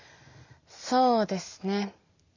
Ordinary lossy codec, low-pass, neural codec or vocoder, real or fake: none; 7.2 kHz; none; real